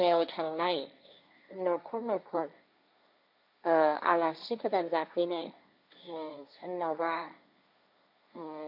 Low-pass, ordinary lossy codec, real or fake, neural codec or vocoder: 5.4 kHz; none; fake; codec, 16 kHz, 1.1 kbps, Voila-Tokenizer